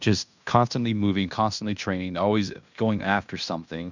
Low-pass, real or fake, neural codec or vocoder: 7.2 kHz; fake; codec, 16 kHz in and 24 kHz out, 0.9 kbps, LongCat-Audio-Codec, fine tuned four codebook decoder